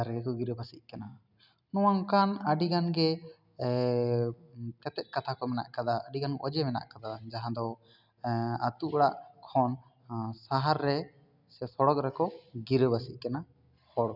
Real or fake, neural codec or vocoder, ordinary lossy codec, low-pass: real; none; none; 5.4 kHz